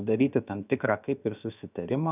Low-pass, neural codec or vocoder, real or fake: 3.6 kHz; codec, 16 kHz, about 1 kbps, DyCAST, with the encoder's durations; fake